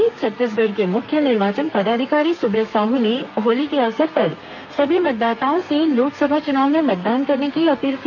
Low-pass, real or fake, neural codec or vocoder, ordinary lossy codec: 7.2 kHz; fake; codec, 32 kHz, 1.9 kbps, SNAC; none